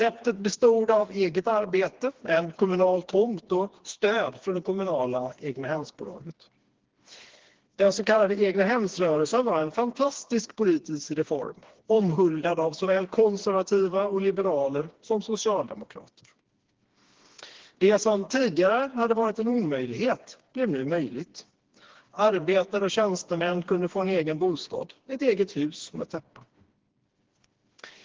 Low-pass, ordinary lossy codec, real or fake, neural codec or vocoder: 7.2 kHz; Opus, 16 kbps; fake; codec, 16 kHz, 2 kbps, FreqCodec, smaller model